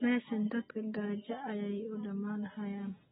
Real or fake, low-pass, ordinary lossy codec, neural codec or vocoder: real; 19.8 kHz; AAC, 16 kbps; none